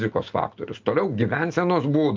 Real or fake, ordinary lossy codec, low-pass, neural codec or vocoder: real; Opus, 32 kbps; 7.2 kHz; none